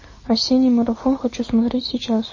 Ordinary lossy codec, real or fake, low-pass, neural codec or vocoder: MP3, 32 kbps; real; 7.2 kHz; none